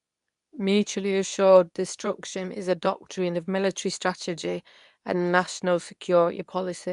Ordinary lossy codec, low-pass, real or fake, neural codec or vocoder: none; 10.8 kHz; fake; codec, 24 kHz, 0.9 kbps, WavTokenizer, medium speech release version 2